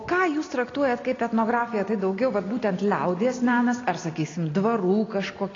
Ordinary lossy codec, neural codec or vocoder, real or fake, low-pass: AAC, 32 kbps; none; real; 7.2 kHz